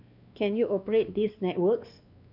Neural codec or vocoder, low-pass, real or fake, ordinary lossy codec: codec, 16 kHz, 2 kbps, X-Codec, WavLM features, trained on Multilingual LibriSpeech; 5.4 kHz; fake; none